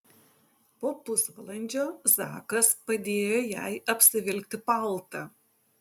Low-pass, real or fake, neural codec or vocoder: 19.8 kHz; real; none